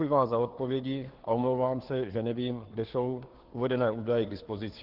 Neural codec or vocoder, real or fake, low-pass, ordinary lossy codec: codec, 16 kHz, 2 kbps, FunCodec, trained on Chinese and English, 25 frames a second; fake; 5.4 kHz; Opus, 16 kbps